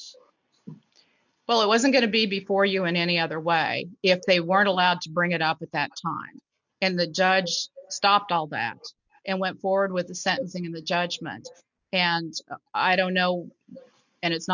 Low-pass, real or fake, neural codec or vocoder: 7.2 kHz; real; none